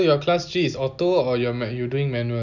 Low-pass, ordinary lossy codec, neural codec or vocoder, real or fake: 7.2 kHz; none; none; real